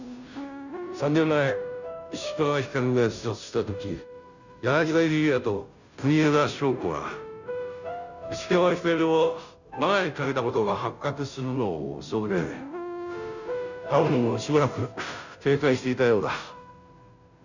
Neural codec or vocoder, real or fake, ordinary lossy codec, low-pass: codec, 16 kHz, 0.5 kbps, FunCodec, trained on Chinese and English, 25 frames a second; fake; none; 7.2 kHz